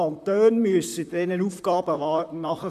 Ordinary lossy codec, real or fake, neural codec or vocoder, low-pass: none; fake; vocoder, 44.1 kHz, 128 mel bands, Pupu-Vocoder; 14.4 kHz